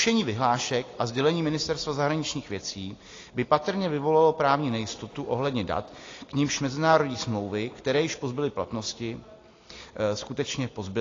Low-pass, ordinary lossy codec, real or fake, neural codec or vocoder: 7.2 kHz; AAC, 32 kbps; real; none